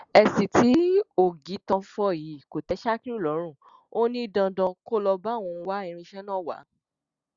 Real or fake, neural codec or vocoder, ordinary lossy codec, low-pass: real; none; Opus, 64 kbps; 7.2 kHz